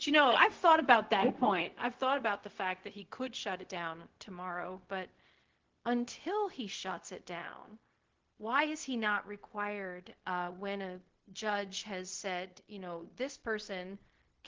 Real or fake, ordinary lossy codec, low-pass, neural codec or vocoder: fake; Opus, 16 kbps; 7.2 kHz; codec, 16 kHz, 0.4 kbps, LongCat-Audio-Codec